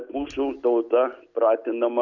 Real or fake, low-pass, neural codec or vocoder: real; 7.2 kHz; none